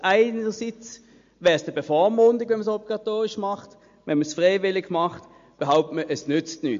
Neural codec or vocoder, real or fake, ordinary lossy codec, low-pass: none; real; MP3, 48 kbps; 7.2 kHz